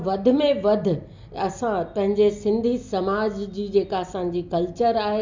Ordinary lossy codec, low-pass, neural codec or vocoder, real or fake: MP3, 64 kbps; 7.2 kHz; none; real